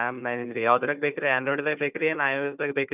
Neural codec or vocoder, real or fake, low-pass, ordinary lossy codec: codec, 16 kHz, 4 kbps, FunCodec, trained on Chinese and English, 50 frames a second; fake; 3.6 kHz; none